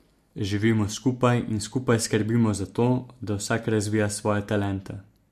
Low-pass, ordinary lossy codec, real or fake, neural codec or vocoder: 14.4 kHz; MP3, 64 kbps; real; none